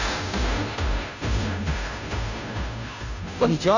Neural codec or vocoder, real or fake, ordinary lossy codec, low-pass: codec, 16 kHz, 0.5 kbps, FunCodec, trained on Chinese and English, 25 frames a second; fake; none; 7.2 kHz